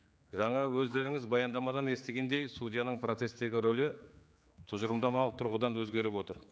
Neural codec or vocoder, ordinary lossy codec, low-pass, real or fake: codec, 16 kHz, 4 kbps, X-Codec, HuBERT features, trained on general audio; none; none; fake